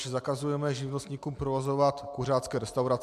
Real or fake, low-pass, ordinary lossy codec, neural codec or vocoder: real; 14.4 kHz; MP3, 96 kbps; none